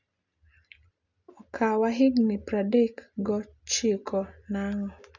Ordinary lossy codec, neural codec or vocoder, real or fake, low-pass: none; none; real; 7.2 kHz